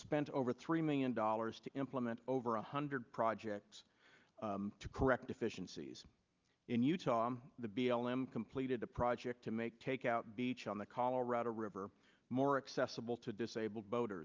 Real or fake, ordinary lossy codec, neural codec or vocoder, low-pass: real; Opus, 24 kbps; none; 7.2 kHz